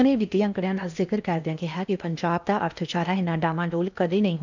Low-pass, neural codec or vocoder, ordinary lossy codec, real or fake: 7.2 kHz; codec, 16 kHz in and 24 kHz out, 0.8 kbps, FocalCodec, streaming, 65536 codes; none; fake